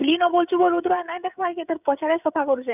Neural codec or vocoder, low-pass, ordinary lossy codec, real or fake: codec, 16 kHz, 16 kbps, FreqCodec, smaller model; 3.6 kHz; none; fake